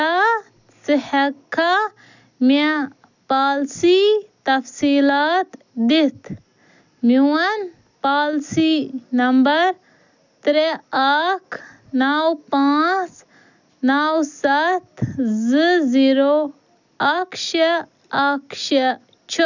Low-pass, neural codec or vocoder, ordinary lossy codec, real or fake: 7.2 kHz; none; none; real